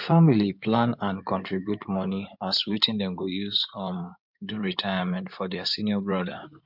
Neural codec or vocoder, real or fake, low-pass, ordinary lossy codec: codec, 16 kHz in and 24 kHz out, 2.2 kbps, FireRedTTS-2 codec; fake; 5.4 kHz; MP3, 48 kbps